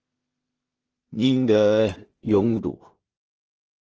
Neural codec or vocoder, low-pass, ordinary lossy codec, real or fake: codec, 16 kHz in and 24 kHz out, 0.4 kbps, LongCat-Audio-Codec, two codebook decoder; 7.2 kHz; Opus, 16 kbps; fake